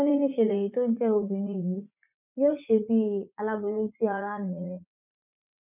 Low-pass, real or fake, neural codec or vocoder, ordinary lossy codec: 3.6 kHz; fake; vocoder, 44.1 kHz, 80 mel bands, Vocos; none